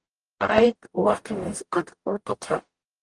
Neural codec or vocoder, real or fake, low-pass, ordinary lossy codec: codec, 44.1 kHz, 0.9 kbps, DAC; fake; 10.8 kHz; Opus, 24 kbps